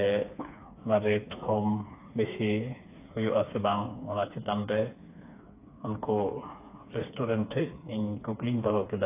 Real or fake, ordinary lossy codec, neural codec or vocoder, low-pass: fake; AAC, 24 kbps; codec, 16 kHz, 4 kbps, FreqCodec, smaller model; 3.6 kHz